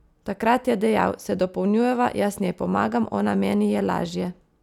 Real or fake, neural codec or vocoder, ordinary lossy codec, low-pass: real; none; none; 19.8 kHz